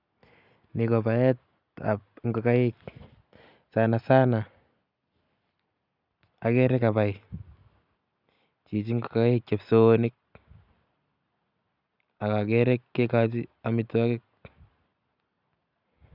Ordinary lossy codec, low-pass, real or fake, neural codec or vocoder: none; 5.4 kHz; real; none